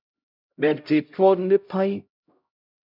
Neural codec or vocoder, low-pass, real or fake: codec, 16 kHz, 0.5 kbps, X-Codec, HuBERT features, trained on LibriSpeech; 5.4 kHz; fake